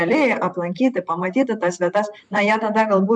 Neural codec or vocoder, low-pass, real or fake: vocoder, 44.1 kHz, 128 mel bands, Pupu-Vocoder; 9.9 kHz; fake